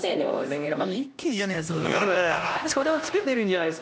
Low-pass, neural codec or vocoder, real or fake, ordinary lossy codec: none; codec, 16 kHz, 1 kbps, X-Codec, HuBERT features, trained on LibriSpeech; fake; none